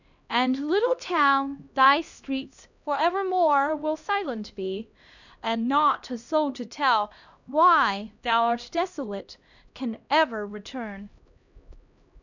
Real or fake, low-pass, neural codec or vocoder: fake; 7.2 kHz; codec, 16 kHz, 1 kbps, X-Codec, HuBERT features, trained on LibriSpeech